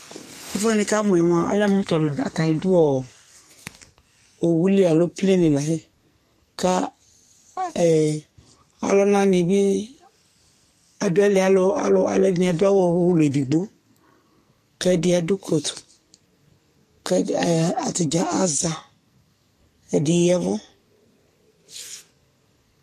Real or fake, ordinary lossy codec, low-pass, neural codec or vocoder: fake; MP3, 64 kbps; 14.4 kHz; codec, 44.1 kHz, 2.6 kbps, SNAC